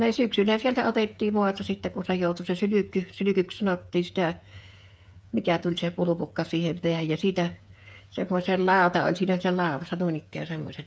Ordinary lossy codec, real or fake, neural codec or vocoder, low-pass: none; fake; codec, 16 kHz, 8 kbps, FreqCodec, smaller model; none